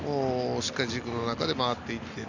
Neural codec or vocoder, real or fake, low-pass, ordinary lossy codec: none; real; 7.2 kHz; none